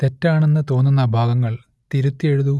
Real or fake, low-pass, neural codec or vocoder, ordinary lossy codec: real; none; none; none